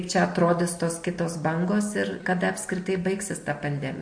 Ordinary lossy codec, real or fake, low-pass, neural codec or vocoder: MP3, 48 kbps; real; 9.9 kHz; none